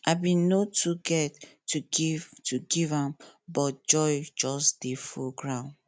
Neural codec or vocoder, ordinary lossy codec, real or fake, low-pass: none; none; real; none